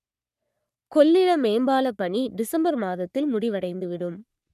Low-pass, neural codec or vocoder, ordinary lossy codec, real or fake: 14.4 kHz; codec, 44.1 kHz, 3.4 kbps, Pupu-Codec; none; fake